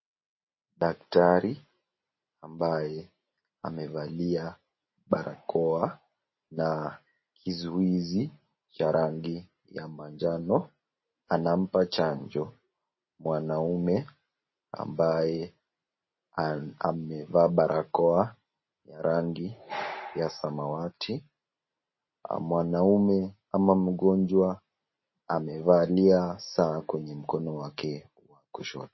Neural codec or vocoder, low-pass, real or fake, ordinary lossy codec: none; 7.2 kHz; real; MP3, 24 kbps